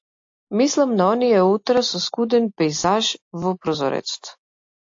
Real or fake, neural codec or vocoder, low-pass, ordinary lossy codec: real; none; 7.2 kHz; AAC, 48 kbps